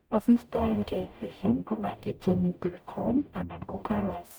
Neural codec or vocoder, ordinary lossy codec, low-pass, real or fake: codec, 44.1 kHz, 0.9 kbps, DAC; none; none; fake